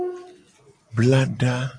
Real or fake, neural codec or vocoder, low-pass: real; none; 9.9 kHz